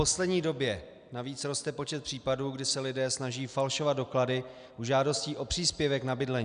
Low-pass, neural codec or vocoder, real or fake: 9.9 kHz; none; real